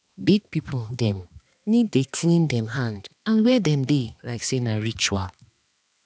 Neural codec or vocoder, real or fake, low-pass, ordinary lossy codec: codec, 16 kHz, 2 kbps, X-Codec, HuBERT features, trained on balanced general audio; fake; none; none